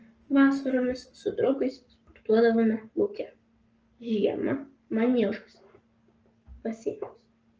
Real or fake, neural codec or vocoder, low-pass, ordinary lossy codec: fake; codec, 44.1 kHz, 7.8 kbps, Pupu-Codec; 7.2 kHz; Opus, 24 kbps